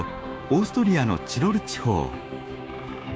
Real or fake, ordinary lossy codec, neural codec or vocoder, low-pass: fake; none; codec, 16 kHz, 6 kbps, DAC; none